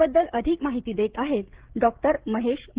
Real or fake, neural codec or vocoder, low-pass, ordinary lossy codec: fake; codec, 16 kHz, 16 kbps, FreqCodec, smaller model; 3.6 kHz; Opus, 16 kbps